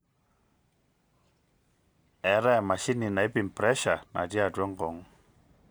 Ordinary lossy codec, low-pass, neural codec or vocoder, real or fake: none; none; none; real